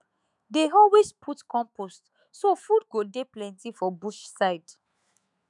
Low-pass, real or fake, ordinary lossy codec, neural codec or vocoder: 10.8 kHz; fake; none; autoencoder, 48 kHz, 128 numbers a frame, DAC-VAE, trained on Japanese speech